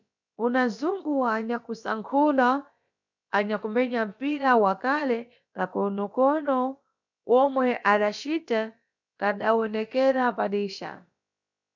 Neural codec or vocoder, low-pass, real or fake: codec, 16 kHz, about 1 kbps, DyCAST, with the encoder's durations; 7.2 kHz; fake